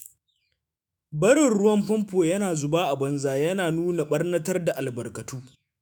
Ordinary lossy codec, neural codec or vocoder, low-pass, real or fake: none; autoencoder, 48 kHz, 128 numbers a frame, DAC-VAE, trained on Japanese speech; none; fake